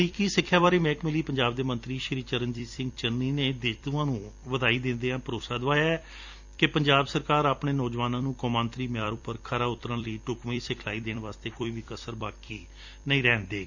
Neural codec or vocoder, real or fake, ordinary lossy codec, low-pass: vocoder, 44.1 kHz, 128 mel bands every 256 samples, BigVGAN v2; fake; Opus, 64 kbps; 7.2 kHz